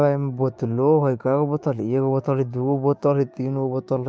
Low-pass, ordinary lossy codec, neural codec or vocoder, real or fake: none; none; codec, 16 kHz, 6 kbps, DAC; fake